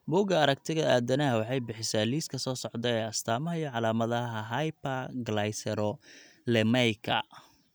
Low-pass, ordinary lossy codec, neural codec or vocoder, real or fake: none; none; none; real